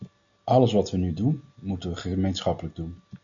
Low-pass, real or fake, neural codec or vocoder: 7.2 kHz; real; none